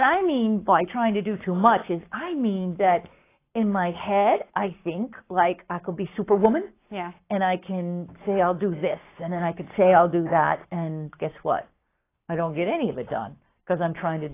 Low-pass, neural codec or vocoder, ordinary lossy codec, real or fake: 3.6 kHz; codec, 44.1 kHz, 7.8 kbps, Pupu-Codec; AAC, 24 kbps; fake